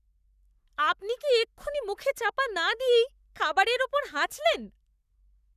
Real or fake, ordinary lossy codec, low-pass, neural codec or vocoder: fake; none; 14.4 kHz; codec, 44.1 kHz, 7.8 kbps, Pupu-Codec